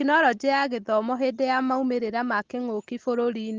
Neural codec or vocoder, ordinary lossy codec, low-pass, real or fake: none; Opus, 16 kbps; 7.2 kHz; real